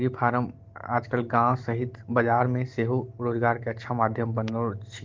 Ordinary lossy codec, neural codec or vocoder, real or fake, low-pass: Opus, 16 kbps; none; real; 7.2 kHz